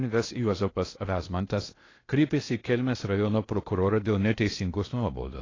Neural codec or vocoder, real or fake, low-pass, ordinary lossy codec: codec, 16 kHz in and 24 kHz out, 0.6 kbps, FocalCodec, streaming, 2048 codes; fake; 7.2 kHz; AAC, 32 kbps